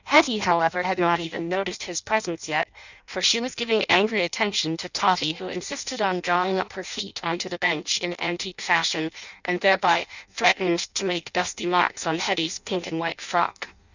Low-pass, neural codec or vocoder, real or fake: 7.2 kHz; codec, 16 kHz in and 24 kHz out, 0.6 kbps, FireRedTTS-2 codec; fake